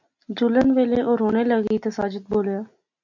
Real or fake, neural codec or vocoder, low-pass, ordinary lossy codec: real; none; 7.2 kHz; MP3, 48 kbps